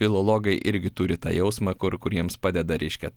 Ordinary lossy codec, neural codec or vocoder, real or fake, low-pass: Opus, 24 kbps; none; real; 19.8 kHz